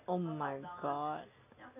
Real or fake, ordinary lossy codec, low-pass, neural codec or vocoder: real; none; 3.6 kHz; none